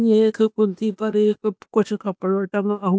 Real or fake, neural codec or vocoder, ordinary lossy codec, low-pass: fake; codec, 16 kHz, 0.8 kbps, ZipCodec; none; none